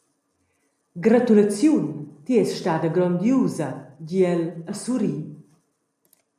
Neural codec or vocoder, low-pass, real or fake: none; 14.4 kHz; real